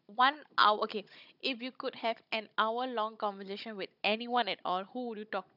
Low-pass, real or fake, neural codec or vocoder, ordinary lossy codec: 5.4 kHz; fake; codec, 16 kHz, 16 kbps, FunCodec, trained on Chinese and English, 50 frames a second; none